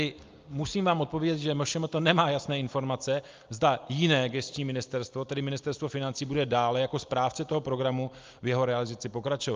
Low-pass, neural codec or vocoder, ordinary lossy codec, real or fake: 7.2 kHz; none; Opus, 32 kbps; real